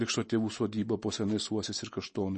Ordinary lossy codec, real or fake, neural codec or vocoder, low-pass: MP3, 32 kbps; real; none; 10.8 kHz